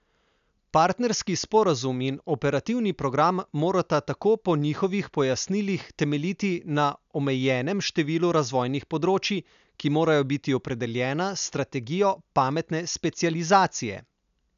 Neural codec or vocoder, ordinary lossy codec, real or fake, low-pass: none; none; real; 7.2 kHz